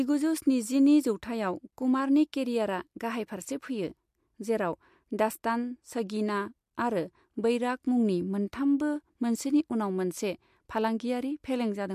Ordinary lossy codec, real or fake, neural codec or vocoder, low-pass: MP3, 64 kbps; real; none; 14.4 kHz